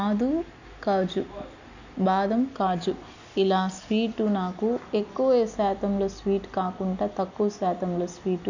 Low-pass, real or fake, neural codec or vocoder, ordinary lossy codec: 7.2 kHz; real; none; none